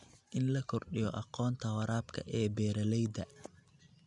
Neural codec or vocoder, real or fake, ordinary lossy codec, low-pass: none; real; none; 10.8 kHz